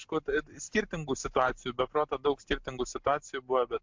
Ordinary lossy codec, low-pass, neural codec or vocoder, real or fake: MP3, 64 kbps; 7.2 kHz; none; real